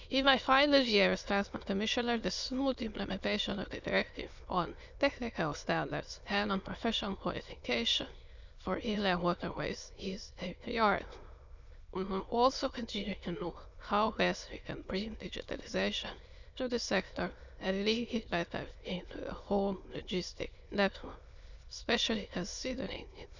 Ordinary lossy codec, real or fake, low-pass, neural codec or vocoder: none; fake; 7.2 kHz; autoencoder, 22.05 kHz, a latent of 192 numbers a frame, VITS, trained on many speakers